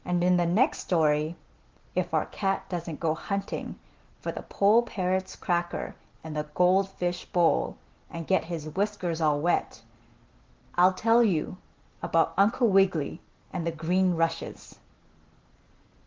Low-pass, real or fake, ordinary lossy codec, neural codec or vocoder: 7.2 kHz; real; Opus, 16 kbps; none